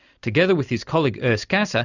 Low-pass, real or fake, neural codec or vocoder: 7.2 kHz; real; none